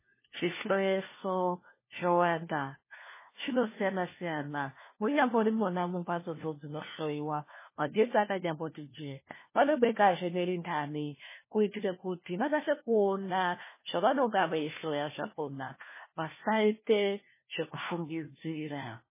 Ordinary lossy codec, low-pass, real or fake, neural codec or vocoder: MP3, 16 kbps; 3.6 kHz; fake; codec, 16 kHz, 1 kbps, FunCodec, trained on LibriTTS, 50 frames a second